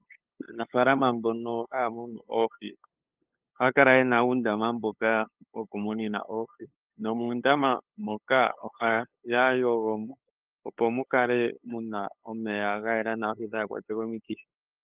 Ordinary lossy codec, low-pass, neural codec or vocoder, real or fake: Opus, 32 kbps; 3.6 kHz; codec, 16 kHz, 8 kbps, FunCodec, trained on LibriTTS, 25 frames a second; fake